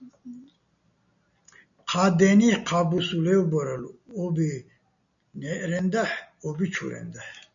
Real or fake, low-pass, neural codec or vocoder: real; 7.2 kHz; none